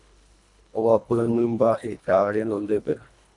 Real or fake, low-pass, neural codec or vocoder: fake; 10.8 kHz; codec, 24 kHz, 1.5 kbps, HILCodec